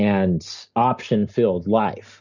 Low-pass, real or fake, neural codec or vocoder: 7.2 kHz; real; none